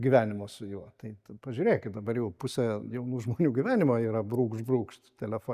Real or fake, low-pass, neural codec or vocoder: real; 14.4 kHz; none